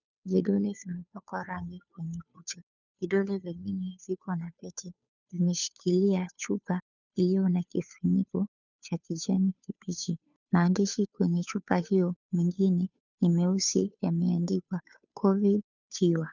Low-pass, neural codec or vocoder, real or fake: 7.2 kHz; codec, 16 kHz, 2 kbps, FunCodec, trained on Chinese and English, 25 frames a second; fake